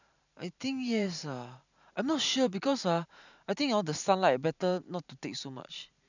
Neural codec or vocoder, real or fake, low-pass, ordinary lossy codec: vocoder, 44.1 kHz, 128 mel bands every 512 samples, BigVGAN v2; fake; 7.2 kHz; none